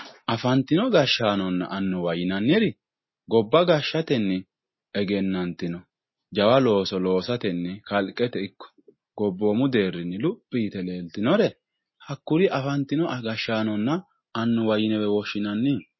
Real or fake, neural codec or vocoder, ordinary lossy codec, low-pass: real; none; MP3, 24 kbps; 7.2 kHz